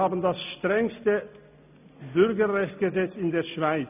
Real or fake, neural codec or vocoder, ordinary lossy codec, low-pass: real; none; none; 3.6 kHz